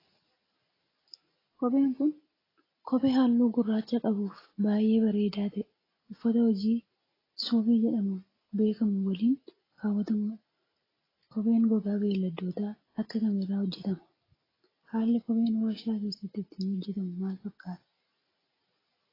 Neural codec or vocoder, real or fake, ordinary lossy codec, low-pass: none; real; AAC, 24 kbps; 5.4 kHz